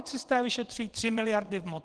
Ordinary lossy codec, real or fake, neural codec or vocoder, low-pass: Opus, 16 kbps; fake; vocoder, 22.05 kHz, 80 mel bands, WaveNeXt; 9.9 kHz